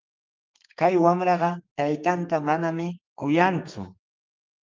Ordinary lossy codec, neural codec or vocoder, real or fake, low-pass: Opus, 24 kbps; codec, 32 kHz, 1.9 kbps, SNAC; fake; 7.2 kHz